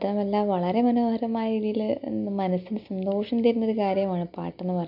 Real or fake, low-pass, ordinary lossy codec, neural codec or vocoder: real; 5.4 kHz; none; none